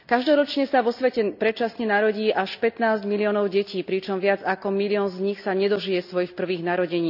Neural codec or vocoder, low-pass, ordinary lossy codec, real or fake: none; 5.4 kHz; none; real